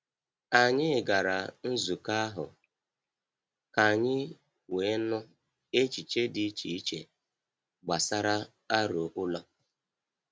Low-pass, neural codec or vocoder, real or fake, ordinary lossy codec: none; none; real; none